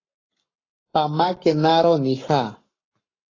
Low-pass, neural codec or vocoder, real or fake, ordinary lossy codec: 7.2 kHz; codec, 44.1 kHz, 7.8 kbps, Pupu-Codec; fake; AAC, 32 kbps